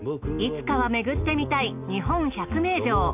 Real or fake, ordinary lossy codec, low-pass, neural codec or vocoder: real; none; 3.6 kHz; none